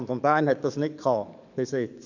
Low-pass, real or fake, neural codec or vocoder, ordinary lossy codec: 7.2 kHz; fake; codec, 44.1 kHz, 7.8 kbps, DAC; none